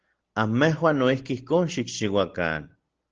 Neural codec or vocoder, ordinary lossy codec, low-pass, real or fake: none; Opus, 16 kbps; 7.2 kHz; real